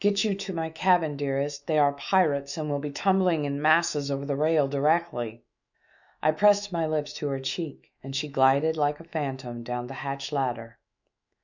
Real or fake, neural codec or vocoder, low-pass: fake; autoencoder, 48 kHz, 128 numbers a frame, DAC-VAE, trained on Japanese speech; 7.2 kHz